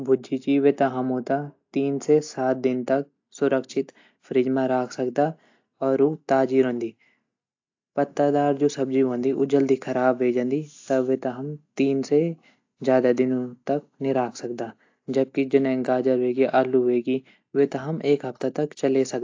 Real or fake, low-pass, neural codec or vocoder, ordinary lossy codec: real; 7.2 kHz; none; AAC, 48 kbps